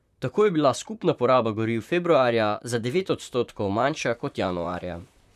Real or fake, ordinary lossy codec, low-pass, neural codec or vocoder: fake; none; 14.4 kHz; vocoder, 44.1 kHz, 128 mel bands, Pupu-Vocoder